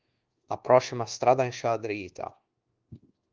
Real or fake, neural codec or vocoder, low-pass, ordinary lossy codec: fake; codec, 24 kHz, 1.2 kbps, DualCodec; 7.2 kHz; Opus, 16 kbps